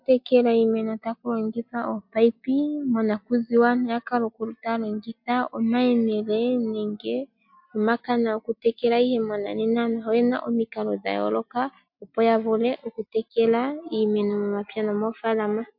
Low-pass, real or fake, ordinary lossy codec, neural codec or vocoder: 5.4 kHz; real; MP3, 48 kbps; none